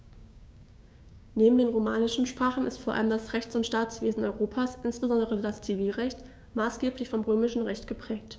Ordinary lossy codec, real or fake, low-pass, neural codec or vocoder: none; fake; none; codec, 16 kHz, 6 kbps, DAC